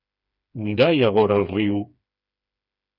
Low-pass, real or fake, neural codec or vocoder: 5.4 kHz; fake; codec, 16 kHz, 4 kbps, FreqCodec, smaller model